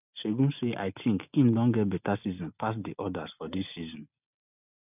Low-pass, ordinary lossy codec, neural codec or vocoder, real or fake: 3.6 kHz; none; none; real